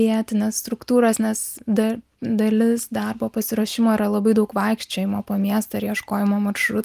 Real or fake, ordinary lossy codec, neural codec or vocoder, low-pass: real; Opus, 32 kbps; none; 14.4 kHz